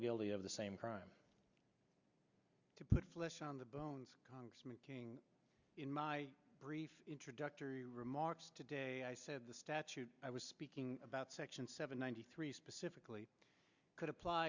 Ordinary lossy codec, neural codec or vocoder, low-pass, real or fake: Opus, 64 kbps; none; 7.2 kHz; real